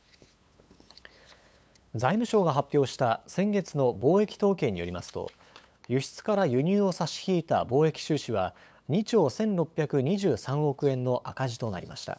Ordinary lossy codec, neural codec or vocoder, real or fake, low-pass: none; codec, 16 kHz, 8 kbps, FunCodec, trained on LibriTTS, 25 frames a second; fake; none